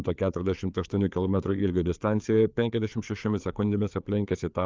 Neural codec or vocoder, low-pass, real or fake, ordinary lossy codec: codec, 16 kHz, 4 kbps, FreqCodec, larger model; 7.2 kHz; fake; Opus, 24 kbps